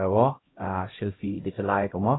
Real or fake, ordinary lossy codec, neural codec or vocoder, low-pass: fake; AAC, 16 kbps; codec, 16 kHz, 0.5 kbps, X-Codec, HuBERT features, trained on LibriSpeech; 7.2 kHz